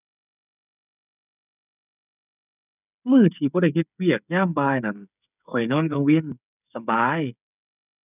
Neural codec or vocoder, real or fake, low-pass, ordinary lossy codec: codec, 16 kHz, 8 kbps, FreqCodec, smaller model; fake; 3.6 kHz; none